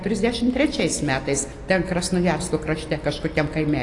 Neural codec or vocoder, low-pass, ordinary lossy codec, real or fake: none; 10.8 kHz; AAC, 48 kbps; real